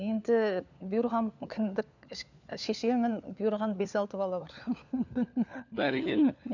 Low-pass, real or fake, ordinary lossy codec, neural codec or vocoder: 7.2 kHz; fake; none; codec, 16 kHz, 4 kbps, FunCodec, trained on LibriTTS, 50 frames a second